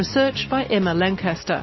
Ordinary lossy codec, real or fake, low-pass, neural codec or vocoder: MP3, 24 kbps; real; 7.2 kHz; none